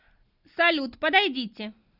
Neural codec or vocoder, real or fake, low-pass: none; real; 5.4 kHz